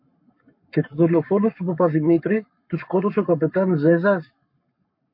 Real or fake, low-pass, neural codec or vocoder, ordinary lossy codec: fake; 5.4 kHz; codec, 44.1 kHz, 7.8 kbps, Pupu-Codec; MP3, 32 kbps